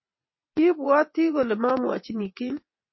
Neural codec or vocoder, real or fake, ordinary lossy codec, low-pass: vocoder, 22.05 kHz, 80 mel bands, WaveNeXt; fake; MP3, 24 kbps; 7.2 kHz